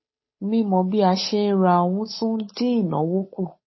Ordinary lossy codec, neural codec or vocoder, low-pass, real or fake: MP3, 24 kbps; codec, 16 kHz, 8 kbps, FunCodec, trained on Chinese and English, 25 frames a second; 7.2 kHz; fake